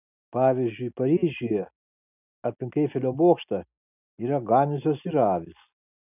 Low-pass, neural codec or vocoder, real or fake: 3.6 kHz; none; real